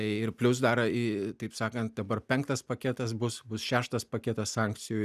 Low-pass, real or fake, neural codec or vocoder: 14.4 kHz; real; none